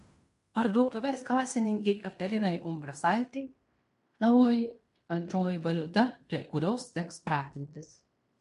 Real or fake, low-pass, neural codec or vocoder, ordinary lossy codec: fake; 10.8 kHz; codec, 16 kHz in and 24 kHz out, 0.9 kbps, LongCat-Audio-Codec, fine tuned four codebook decoder; MP3, 64 kbps